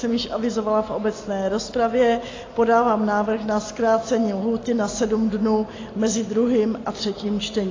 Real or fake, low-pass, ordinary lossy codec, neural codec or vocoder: real; 7.2 kHz; AAC, 32 kbps; none